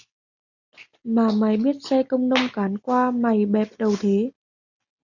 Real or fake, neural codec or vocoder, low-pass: real; none; 7.2 kHz